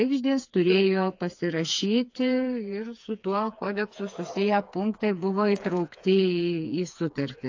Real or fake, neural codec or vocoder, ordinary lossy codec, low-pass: fake; codec, 16 kHz, 4 kbps, FreqCodec, smaller model; AAC, 48 kbps; 7.2 kHz